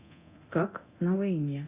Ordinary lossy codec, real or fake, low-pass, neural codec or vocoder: Opus, 64 kbps; fake; 3.6 kHz; codec, 24 kHz, 0.9 kbps, DualCodec